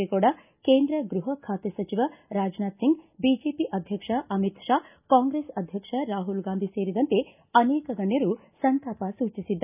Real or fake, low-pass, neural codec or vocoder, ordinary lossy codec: real; 3.6 kHz; none; none